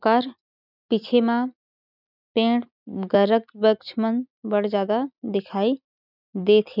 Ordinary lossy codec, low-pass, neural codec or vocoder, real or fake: none; 5.4 kHz; none; real